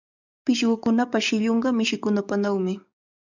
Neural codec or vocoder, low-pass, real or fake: codec, 44.1 kHz, 7.8 kbps, DAC; 7.2 kHz; fake